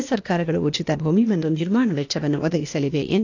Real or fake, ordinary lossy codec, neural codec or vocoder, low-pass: fake; AAC, 48 kbps; codec, 16 kHz, 0.8 kbps, ZipCodec; 7.2 kHz